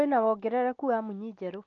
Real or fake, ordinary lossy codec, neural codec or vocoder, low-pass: real; Opus, 24 kbps; none; 7.2 kHz